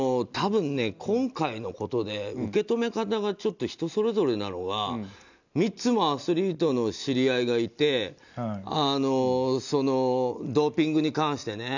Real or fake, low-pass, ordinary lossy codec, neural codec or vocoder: real; 7.2 kHz; none; none